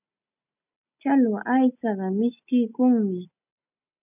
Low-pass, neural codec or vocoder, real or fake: 3.6 kHz; none; real